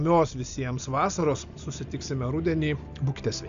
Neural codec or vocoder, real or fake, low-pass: none; real; 7.2 kHz